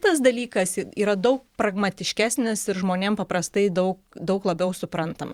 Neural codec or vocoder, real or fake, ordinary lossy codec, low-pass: vocoder, 44.1 kHz, 128 mel bands every 512 samples, BigVGAN v2; fake; Opus, 64 kbps; 19.8 kHz